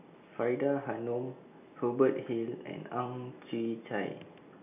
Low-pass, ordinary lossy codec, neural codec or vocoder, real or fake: 3.6 kHz; none; none; real